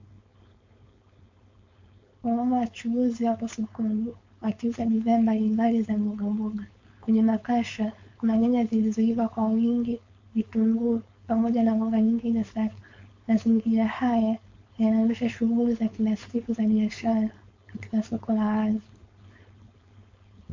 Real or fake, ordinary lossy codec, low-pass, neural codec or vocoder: fake; MP3, 48 kbps; 7.2 kHz; codec, 16 kHz, 4.8 kbps, FACodec